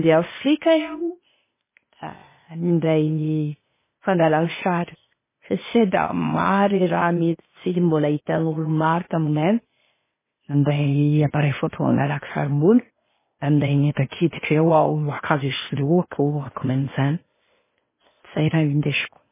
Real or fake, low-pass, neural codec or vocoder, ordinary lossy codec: fake; 3.6 kHz; codec, 16 kHz, 0.8 kbps, ZipCodec; MP3, 16 kbps